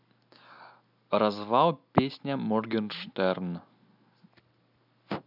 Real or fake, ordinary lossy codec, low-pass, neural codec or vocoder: real; none; 5.4 kHz; none